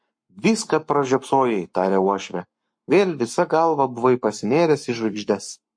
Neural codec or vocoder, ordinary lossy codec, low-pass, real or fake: codec, 44.1 kHz, 7.8 kbps, Pupu-Codec; MP3, 48 kbps; 9.9 kHz; fake